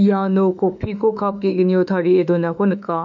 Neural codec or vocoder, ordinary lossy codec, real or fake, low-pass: autoencoder, 48 kHz, 32 numbers a frame, DAC-VAE, trained on Japanese speech; none; fake; 7.2 kHz